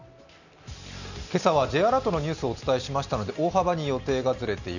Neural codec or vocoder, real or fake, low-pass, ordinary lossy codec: none; real; 7.2 kHz; none